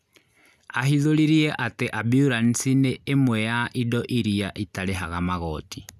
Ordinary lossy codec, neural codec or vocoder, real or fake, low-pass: none; none; real; 14.4 kHz